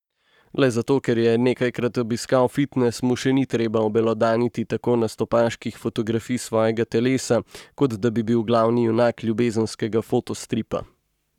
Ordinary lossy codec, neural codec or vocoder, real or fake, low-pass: none; codec, 44.1 kHz, 7.8 kbps, Pupu-Codec; fake; 19.8 kHz